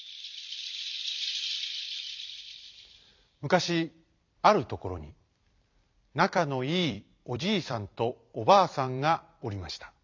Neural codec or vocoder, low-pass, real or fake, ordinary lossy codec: vocoder, 44.1 kHz, 128 mel bands every 256 samples, BigVGAN v2; 7.2 kHz; fake; MP3, 64 kbps